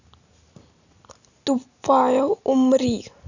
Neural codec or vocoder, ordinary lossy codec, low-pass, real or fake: none; none; 7.2 kHz; real